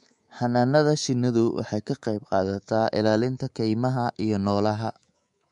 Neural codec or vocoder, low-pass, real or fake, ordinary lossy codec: codec, 24 kHz, 3.1 kbps, DualCodec; 10.8 kHz; fake; MP3, 64 kbps